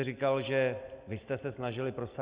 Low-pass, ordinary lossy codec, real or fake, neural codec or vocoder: 3.6 kHz; Opus, 64 kbps; real; none